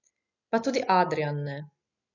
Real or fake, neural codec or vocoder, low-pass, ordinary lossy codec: real; none; 7.2 kHz; none